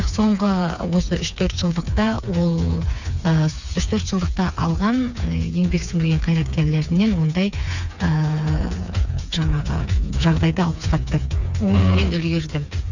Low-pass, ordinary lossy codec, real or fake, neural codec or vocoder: 7.2 kHz; none; fake; codec, 16 kHz, 4 kbps, FreqCodec, smaller model